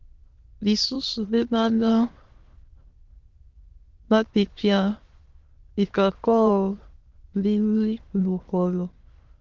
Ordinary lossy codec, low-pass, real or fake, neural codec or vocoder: Opus, 16 kbps; 7.2 kHz; fake; autoencoder, 22.05 kHz, a latent of 192 numbers a frame, VITS, trained on many speakers